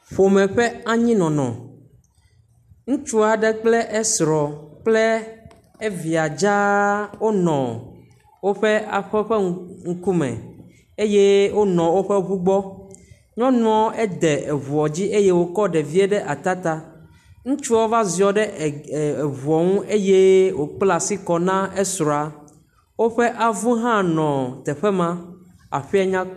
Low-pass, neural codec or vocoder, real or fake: 14.4 kHz; none; real